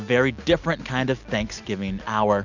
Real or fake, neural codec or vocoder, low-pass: real; none; 7.2 kHz